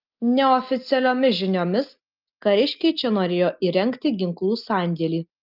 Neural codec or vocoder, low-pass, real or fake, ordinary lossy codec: none; 5.4 kHz; real; Opus, 24 kbps